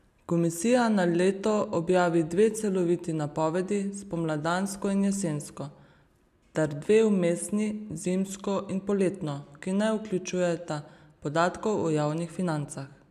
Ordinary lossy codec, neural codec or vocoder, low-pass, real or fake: none; none; 14.4 kHz; real